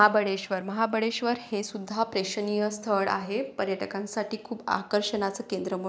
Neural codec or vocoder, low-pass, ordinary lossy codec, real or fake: none; none; none; real